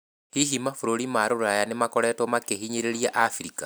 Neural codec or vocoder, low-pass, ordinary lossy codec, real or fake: none; none; none; real